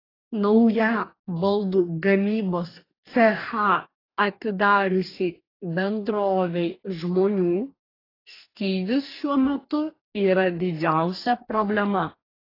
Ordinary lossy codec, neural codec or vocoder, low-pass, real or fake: AAC, 24 kbps; codec, 44.1 kHz, 2.6 kbps, DAC; 5.4 kHz; fake